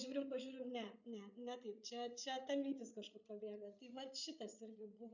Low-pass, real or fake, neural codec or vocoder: 7.2 kHz; fake; codec, 16 kHz, 8 kbps, FreqCodec, larger model